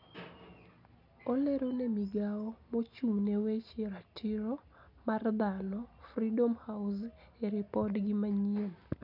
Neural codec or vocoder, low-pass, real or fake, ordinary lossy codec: none; 5.4 kHz; real; none